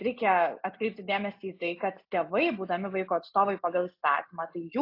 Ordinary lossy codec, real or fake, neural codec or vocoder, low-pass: AAC, 32 kbps; real; none; 5.4 kHz